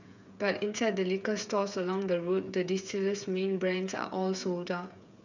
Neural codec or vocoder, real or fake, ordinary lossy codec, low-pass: codec, 16 kHz, 8 kbps, FreqCodec, smaller model; fake; none; 7.2 kHz